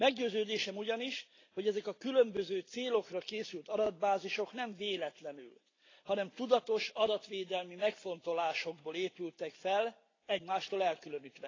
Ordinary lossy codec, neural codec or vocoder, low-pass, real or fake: AAC, 32 kbps; none; 7.2 kHz; real